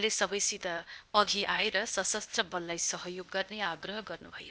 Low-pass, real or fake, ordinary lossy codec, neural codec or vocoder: none; fake; none; codec, 16 kHz, 0.8 kbps, ZipCodec